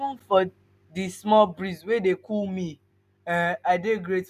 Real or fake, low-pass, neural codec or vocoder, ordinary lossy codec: real; 14.4 kHz; none; none